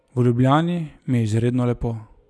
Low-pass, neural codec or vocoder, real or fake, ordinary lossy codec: none; none; real; none